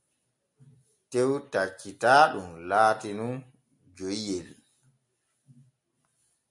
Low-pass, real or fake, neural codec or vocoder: 10.8 kHz; real; none